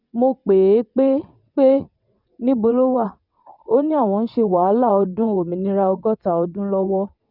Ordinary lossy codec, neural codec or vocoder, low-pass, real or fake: none; vocoder, 22.05 kHz, 80 mel bands, WaveNeXt; 5.4 kHz; fake